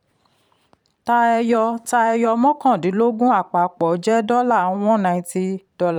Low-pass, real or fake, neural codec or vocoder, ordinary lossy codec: 19.8 kHz; fake; vocoder, 44.1 kHz, 128 mel bands every 512 samples, BigVGAN v2; none